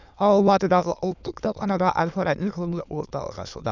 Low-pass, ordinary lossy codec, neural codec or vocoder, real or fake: 7.2 kHz; none; autoencoder, 22.05 kHz, a latent of 192 numbers a frame, VITS, trained on many speakers; fake